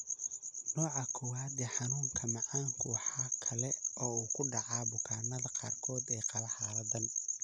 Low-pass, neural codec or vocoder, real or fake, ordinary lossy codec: 9.9 kHz; none; real; none